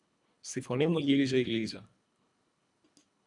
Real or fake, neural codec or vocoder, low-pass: fake; codec, 24 kHz, 1.5 kbps, HILCodec; 10.8 kHz